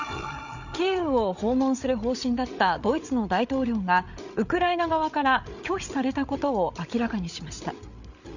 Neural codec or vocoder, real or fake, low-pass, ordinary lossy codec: codec, 16 kHz, 8 kbps, FreqCodec, larger model; fake; 7.2 kHz; none